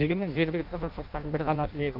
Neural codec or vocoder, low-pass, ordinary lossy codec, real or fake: codec, 16 kHz in and 24 kHz out, 0.6 kbps, FireRedTTS-2 codec; 5.4 kHz; none; fake